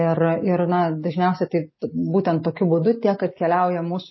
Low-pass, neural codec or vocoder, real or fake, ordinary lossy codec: 7.2 kHz; none; real; MP3, 24 kbps